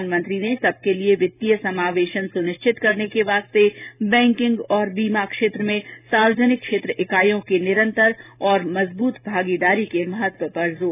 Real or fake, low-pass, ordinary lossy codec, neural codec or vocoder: real; 3.6 kHz; none; none